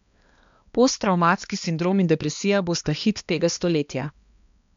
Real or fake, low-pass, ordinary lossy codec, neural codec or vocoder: fake; 7.2 kHz; MP3, 64 kbps; codec, 16 kHz, 2 kbps, X-Codec, HuBERT features, trained on balanced general audio